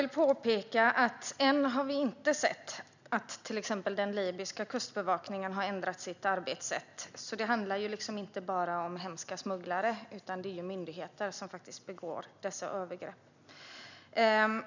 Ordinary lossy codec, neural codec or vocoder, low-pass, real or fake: none; none; 7.2 kHz; real